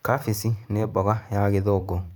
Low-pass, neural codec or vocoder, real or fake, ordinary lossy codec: none; vocoder, 44.1 kHz, 128 mel bands every 512 samples, BigVGAN v2; fake; none